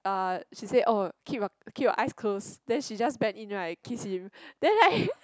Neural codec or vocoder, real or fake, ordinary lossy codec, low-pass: none; real; none; none